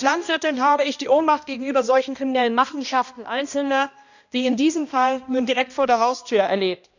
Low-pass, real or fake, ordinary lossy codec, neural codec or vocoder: 7.2 kHz; fake; none; codec, 16 kHz, 1 kbps, X-Codec, HuBERT features, trained on balanced general audio